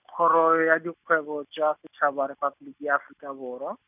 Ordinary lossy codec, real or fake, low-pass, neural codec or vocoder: none; real; 3.6 kHz; none